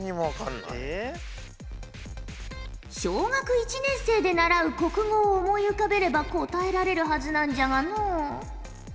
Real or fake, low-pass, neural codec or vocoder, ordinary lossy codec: real; none; none; none